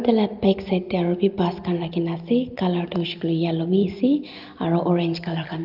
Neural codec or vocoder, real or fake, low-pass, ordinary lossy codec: none; real; 5.4 kHz; Opus, 32 kbps